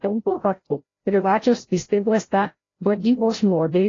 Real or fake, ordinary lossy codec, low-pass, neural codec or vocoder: fake; AAC, 32 kbps; 7.2 kHz; codec, 16 kHz, 0.5 kbps, FreqCodec, larger model